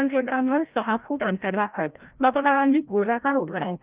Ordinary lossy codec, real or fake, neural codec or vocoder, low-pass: Opus, 24 kbps; fake; codec, 16 kHz, 0.5 kbps, FreqCodec, larger model; 3.6 kHz